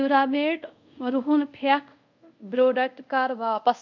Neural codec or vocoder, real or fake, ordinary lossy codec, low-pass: codec, 24 kHz, 0.5 kbps, DualCodec; fake; none; 7.2 kHz